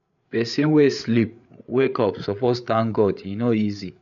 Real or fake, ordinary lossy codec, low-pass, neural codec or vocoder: fake; none; 7.2 kHz; codec, 16 kHz, 8 kbps, FreqCodec, larger model